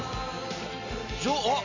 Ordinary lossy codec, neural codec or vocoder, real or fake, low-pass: none; none; real; 7.2 kHz